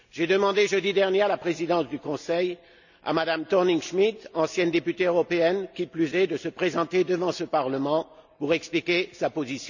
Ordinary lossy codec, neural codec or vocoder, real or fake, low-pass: none; none; real; 7.2 kHz